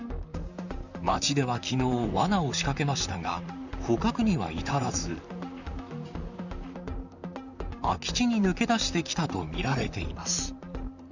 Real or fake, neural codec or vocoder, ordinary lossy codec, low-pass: fake; vocoder, 44.1 kHz, 128 mel bands, Pupu-Vocoder; none; 7.2 kHz